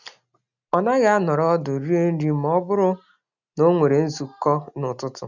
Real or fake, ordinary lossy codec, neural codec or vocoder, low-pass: real; none; none; 7.2 kHz